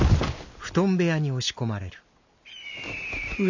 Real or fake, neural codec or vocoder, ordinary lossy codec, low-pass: real; none; none; 7.2 kHz